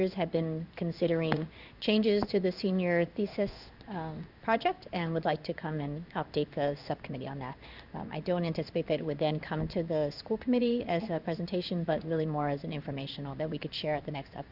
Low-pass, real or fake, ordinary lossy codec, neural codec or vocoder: 5.4 kHz; fake; Opus, 64 kbps; codec, 16 kHz in and 24 kHz out, 1 kbps, XY-Tokenizer